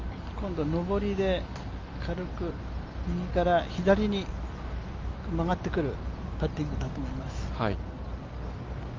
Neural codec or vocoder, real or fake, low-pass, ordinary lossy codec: none; real; 7.2 kHz; Opus, 32 kbps